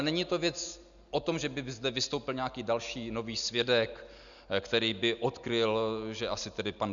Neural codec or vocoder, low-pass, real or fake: none; 7.2 kHz; real